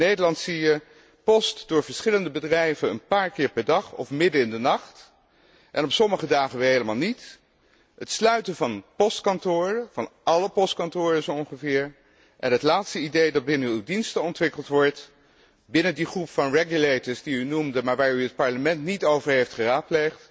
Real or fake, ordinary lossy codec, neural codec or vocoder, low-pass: real; none; none; none